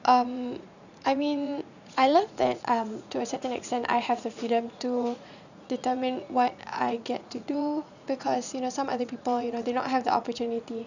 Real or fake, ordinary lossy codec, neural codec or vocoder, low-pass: fake; none; vocoder, 44.1 kHz, 80 mel bands, Vocos; 7.2 kHz